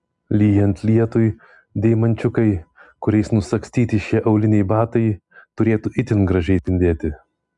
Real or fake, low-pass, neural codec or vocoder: real; 10.8 kHz; none